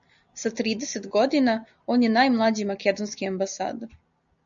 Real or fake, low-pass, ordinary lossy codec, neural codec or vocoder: real; 7.2 kHz; MP3, 96 kbps; none